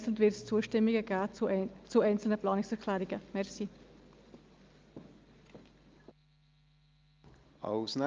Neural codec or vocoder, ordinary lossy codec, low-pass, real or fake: none; Opus, 24 kbps; 7.2 kHz; real